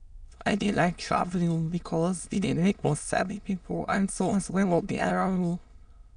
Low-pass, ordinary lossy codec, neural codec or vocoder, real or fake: 9.9 kHz; none; autoencoder, 22.05 kHz, a latent of 192 numbers a frame, VITS, trained on many speakers; fake